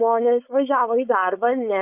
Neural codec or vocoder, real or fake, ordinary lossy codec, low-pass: codec, 16 kHz, 4.8 kbps, FACodec; fake; Opus, 64 kbps; 3.6 kHz